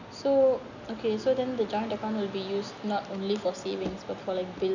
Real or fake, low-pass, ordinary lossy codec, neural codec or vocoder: real; 7.2 kHz; none; none